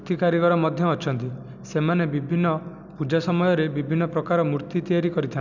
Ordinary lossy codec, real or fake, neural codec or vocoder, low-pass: none; real; none; 7.2 kHz